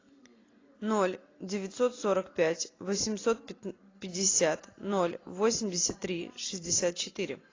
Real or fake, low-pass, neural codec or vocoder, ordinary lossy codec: real; 7.2 kHz; none; AAC, 32 kbps